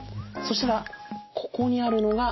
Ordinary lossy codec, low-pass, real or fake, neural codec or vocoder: MP3, 24 kbps; 7.2 kHz; real; none